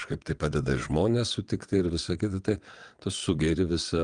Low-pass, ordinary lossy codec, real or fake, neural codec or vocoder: 9.9 kHz; Opus, 32 kbps; fake; vocoder, 22.05 kHz, 80 mel bands, WaveNeXt